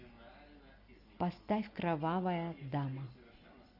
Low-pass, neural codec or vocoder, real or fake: 5.4 kHz; none; real